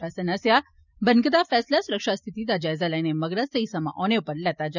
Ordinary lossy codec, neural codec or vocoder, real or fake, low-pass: none; none; real; 7.2 kHz